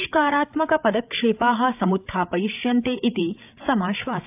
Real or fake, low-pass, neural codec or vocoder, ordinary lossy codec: fake; 3.6 kHz; codec, 16 kHz, 8 kbps, FreqCodec, larger model; AAC, 32 kbps